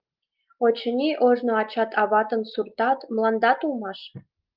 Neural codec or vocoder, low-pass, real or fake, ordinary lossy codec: none; 5.4 kHz; real; Opus, 32 kbps